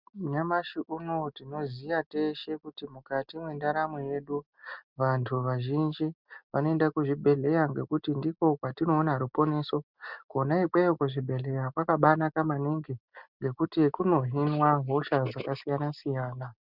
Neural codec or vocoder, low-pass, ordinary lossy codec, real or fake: none; 5.4 kHz; Opus, 64 kbps; real